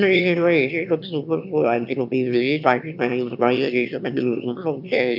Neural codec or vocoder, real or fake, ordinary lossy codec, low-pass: autoencoder, 22.05 kHz, a latent of 192 numbers a frame, VITS, trained on one speaker; fake; none; 5.4 kHz